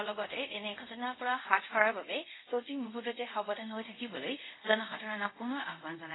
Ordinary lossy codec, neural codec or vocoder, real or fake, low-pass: AAC, 16 kbps; codec, 24 kHz, 0.5 kbps, DualCodec; fake; 7.2 kHz